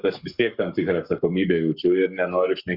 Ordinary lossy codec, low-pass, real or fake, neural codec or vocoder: Opus, 64 kbps; 5.4 kHz; fake; codec, 44.1 kHz, 7.8 kbps, Pupu-Codec